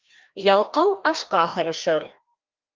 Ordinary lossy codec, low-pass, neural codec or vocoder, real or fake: Opus, 32 kbps; 7.2 kHz; codec, 16 kHz, 1 kbps, FreqCodec, larger model; fake